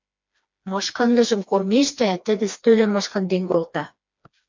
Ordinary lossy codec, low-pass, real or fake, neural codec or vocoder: MP3, 48 kbps; 7.2 kHz; fake; codec, 16 kHz, 2 kbps, FreqCodec, smaller model